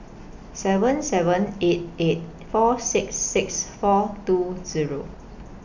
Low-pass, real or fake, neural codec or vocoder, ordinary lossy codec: 7.2 kHz; real; none; none